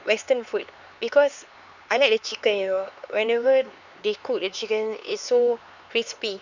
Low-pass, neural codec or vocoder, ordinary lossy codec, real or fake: 7.2 kHz; codec, 16 kHz, 4 kbps, X-Codec, HuBERT features, trained on LibriSpeech; none; fake